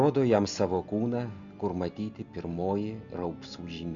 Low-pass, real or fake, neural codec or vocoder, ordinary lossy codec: 7.2 kHz; real; none; Opus, 64 kbps